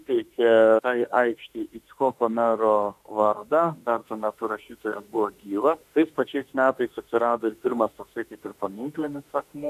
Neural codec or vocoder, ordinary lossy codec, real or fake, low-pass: autoencoder, 48 kHz, 32 numbers a frame, DAC-VAE, trained on Japanese speech; AAC, 96 kbps; fake; 14.4 kHz